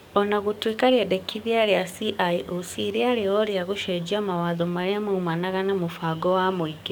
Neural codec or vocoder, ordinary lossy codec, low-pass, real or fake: codec, 44.1 kHz, 7.8 kbps, DAC; none; 19.8 kHz; fake